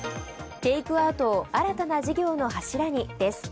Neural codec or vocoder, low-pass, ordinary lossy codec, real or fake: none; none; none; real